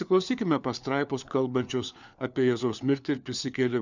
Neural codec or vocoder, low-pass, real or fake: codec, 16 kHz, 4 kbps, FunCodec, trained on LibriTTS, 50 frames a second; 7.2 kHz; fake